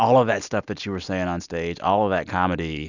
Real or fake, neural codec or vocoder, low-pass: real; none; 7.2 kHz